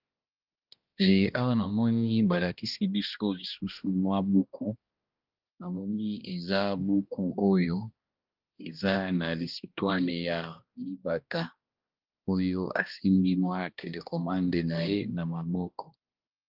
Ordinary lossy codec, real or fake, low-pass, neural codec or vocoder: Opus, 32 kbps; fake; 5.4 kHz; codec, 16 kHz, 1 kbps, X-Codec, HuBERT features, trained on balanced general audio